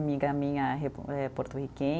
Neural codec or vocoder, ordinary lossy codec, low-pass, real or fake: none; none; none; real